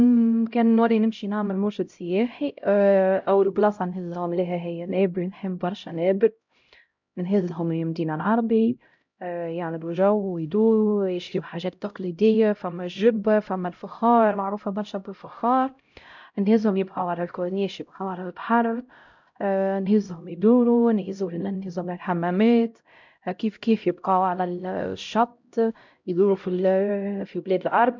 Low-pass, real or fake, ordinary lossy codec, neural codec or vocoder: 7.2 kHz; fake; MP3, 64 kbps; codec, 16 kHz, 0.5 kbps, X-Codec, HuBERT features, trained on LibriSpeech